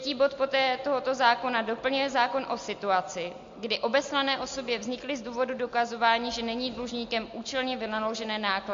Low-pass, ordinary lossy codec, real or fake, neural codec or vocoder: 7.2 kHz; MP3, 48 kbps; real; none